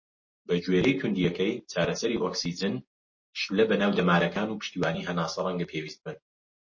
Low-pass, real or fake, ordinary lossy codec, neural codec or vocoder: 7.2 kHz; real; MP3, 32 kbps; none